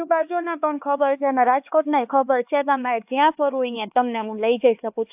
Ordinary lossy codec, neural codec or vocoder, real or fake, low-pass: AAC, 32 kbps; codec, 16 kHz, 2 kbps, X-Codec, HuBERT features, trained on LibriSpeech; fake; 3.6 kHz